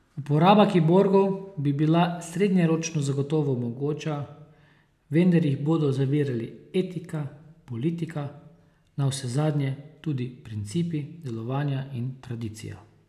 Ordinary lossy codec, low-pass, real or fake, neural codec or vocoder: none; 14.4 kHz; real; none